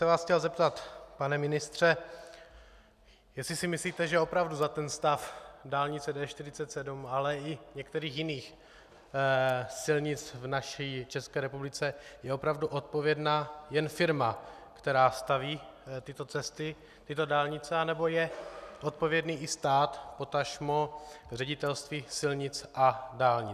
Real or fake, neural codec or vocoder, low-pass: real; none; 14.4 kHz